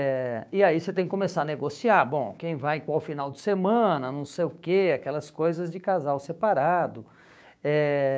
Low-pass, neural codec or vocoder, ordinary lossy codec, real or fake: none; codec, 16 kHz, 6 kbps, DAC; none; fake